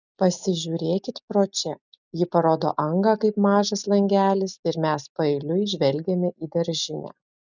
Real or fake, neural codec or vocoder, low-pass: real; none; 7.2 kHz